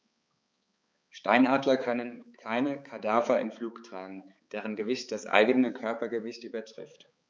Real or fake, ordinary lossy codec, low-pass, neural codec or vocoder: fake; none; none; codec, 16 kHz, 4 kbps, X-Codec, HuBERT features, trained on balanced general audio